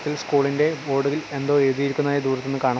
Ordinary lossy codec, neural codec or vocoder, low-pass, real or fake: none; none; none; real